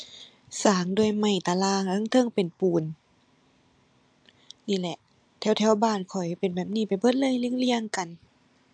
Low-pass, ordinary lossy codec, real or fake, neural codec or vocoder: 9.9 kHz; AAC, 64 kbps; real; none